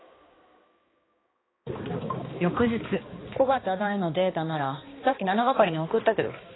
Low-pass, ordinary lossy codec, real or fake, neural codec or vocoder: 7.2 kHz; AAC, 16 kbps; fake; codec, 16 kHz, 2 kbps, X-Codec, HuBERT features, trained on balanced general audio